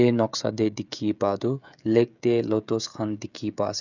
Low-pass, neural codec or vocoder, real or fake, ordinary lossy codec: 7.2 kHz; codec, 16 kHz, 16 kbps, FreqCodec, smaller model; fake; none